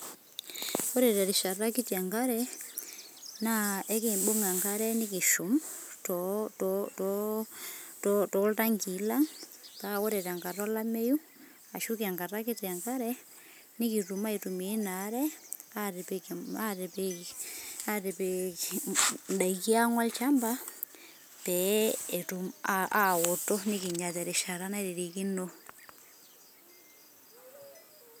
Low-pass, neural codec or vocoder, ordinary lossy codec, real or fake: none; none; none; real